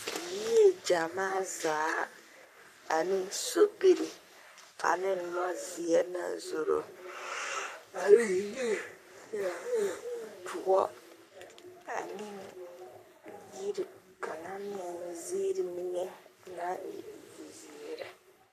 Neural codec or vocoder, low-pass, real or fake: codec, 44.1 kHz, 3.4 kbps, Pupu-Codec; 14.4 kHz; fake